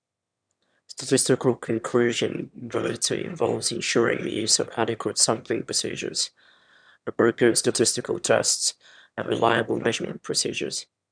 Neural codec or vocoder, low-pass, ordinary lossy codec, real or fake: autoencoder, 22.05 kHz, a latent of 192 numbers a frame, VITS, trained on one speaker; 9.9 kHz; none; fake